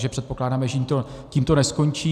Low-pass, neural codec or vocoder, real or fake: 14.4 kHz; none; real